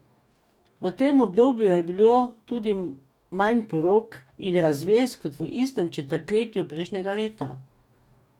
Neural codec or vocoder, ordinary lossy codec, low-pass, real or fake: codec, 44.1 kHz, 2.6 kbps, DAC; none; 19.8 kHz; fake